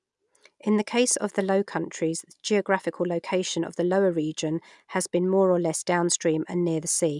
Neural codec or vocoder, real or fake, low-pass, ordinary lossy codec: none; real; 10.8 kHz; none